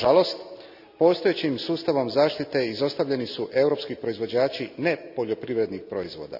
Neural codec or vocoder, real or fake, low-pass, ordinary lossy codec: none; real; 5.4 kHz; none